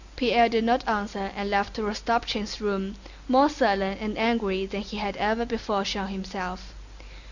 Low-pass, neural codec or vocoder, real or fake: 7.2 kHz; none; real